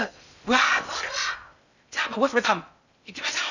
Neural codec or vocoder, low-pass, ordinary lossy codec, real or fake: codec, 16 kHz in and 24 kHz out, 0.6 kbps, FocalCodec, streaming, 2048 codes; 7.2 kHz; none; fake